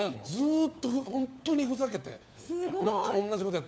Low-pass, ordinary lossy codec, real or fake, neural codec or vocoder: none; none; fake; codec, 16 kHz, 4 kbps, FunCodec, trained on LibriTTS, 50 frames a second